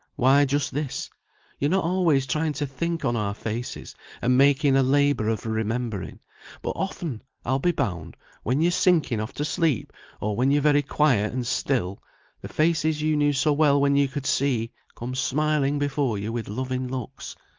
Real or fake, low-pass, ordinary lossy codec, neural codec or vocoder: real; 7.2 kHz; Opus, 32 kbps; none